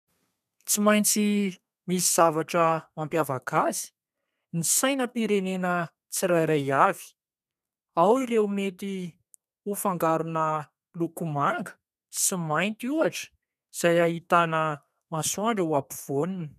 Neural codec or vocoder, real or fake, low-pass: codec, 32 kHz, 1.9 kbps, SNAC; fake; 14.4 kHz